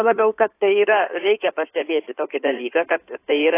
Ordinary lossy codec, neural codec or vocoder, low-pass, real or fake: AAC, 24 kbps; codec, 16 kHz in and 24 kHz out, 2.2 kbps, FireRedTTS-2 codec; 3.6 kHz; fake